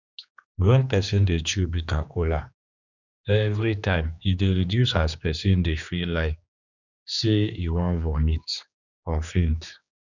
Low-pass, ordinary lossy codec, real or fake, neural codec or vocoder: 7.2 kHz; none; fake; codec, 16 kHz, 2 kbps, X-Codec, HuBERT features, trained on general audio